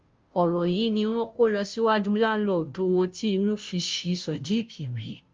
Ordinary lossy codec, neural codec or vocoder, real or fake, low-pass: Opus, 32 kbps; codec, 16 kHz, 0.5 kbps, FunCodec, trained on Chinese and English, 25 frames a second; fake; 7.2 kHz